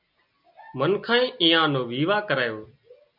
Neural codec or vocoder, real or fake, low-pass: none; real; 5.4 kHz